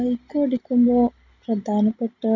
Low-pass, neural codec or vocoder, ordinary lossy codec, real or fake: 7.2 kHz; none; none; real